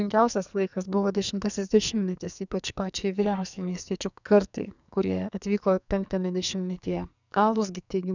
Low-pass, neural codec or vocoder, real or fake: 7.2 kHz; codec, 44.1 kHz, 2.6 kbps, SNAC; fake